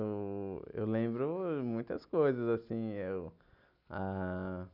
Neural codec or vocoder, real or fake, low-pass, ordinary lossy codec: none; real; 5.4 kHz; none